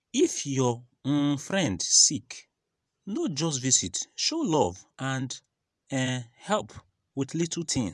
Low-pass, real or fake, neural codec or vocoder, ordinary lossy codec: none; fake; vocoder, 24 kHz, 100 mel bands, Vocos; none